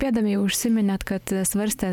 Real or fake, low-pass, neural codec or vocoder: real; 19.8 kHz; none